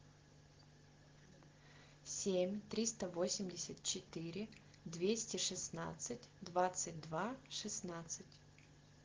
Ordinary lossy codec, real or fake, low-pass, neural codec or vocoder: Opus, 16 kbps; real; 7.2 kHz; none